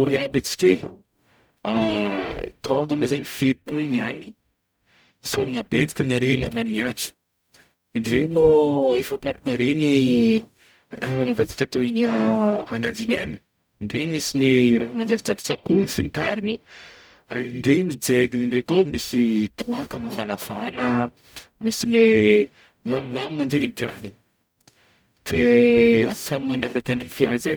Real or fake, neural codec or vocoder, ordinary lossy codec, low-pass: fake; codec, 44.1 kHz, 0.9 kbps, DAC; none; none